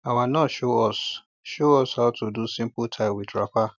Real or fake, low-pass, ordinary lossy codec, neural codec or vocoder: real; 7.2 kHz; none; none